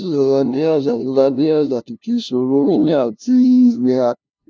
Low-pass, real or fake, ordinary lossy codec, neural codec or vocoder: none; fake; none; codec, 16 kHz, 0.5 kbps, FunCodec, trained on LibriTTS, 25 frames a second